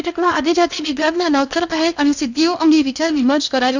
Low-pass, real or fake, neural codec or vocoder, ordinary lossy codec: 7.2 kHz; fake; codec, 16 kHz in and 24 kHz out, 0.8 kbps, FocalCodec, streaming, 65536 codes; none